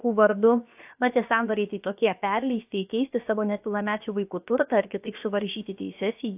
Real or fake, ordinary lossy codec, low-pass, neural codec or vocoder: fake; AAC, 32 kbps; 3.6 kHz; codec, 16 kHz, about 1 kbps, DyCAST, with the encoder's durations